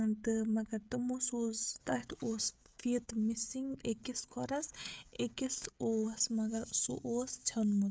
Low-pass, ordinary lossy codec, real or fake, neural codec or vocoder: none; none; fake; codec, 16 kHz, 16 kbps, FreqCodec, smaller model